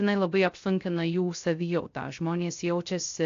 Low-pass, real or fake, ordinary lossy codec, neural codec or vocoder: 7.2 kHz; fake; AAC, 48 kbps; codec, 16 kHz, 0.3 kbps, FocalCodec